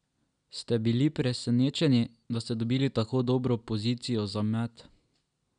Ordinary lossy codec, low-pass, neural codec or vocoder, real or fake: none; 9.9 kHz; none; real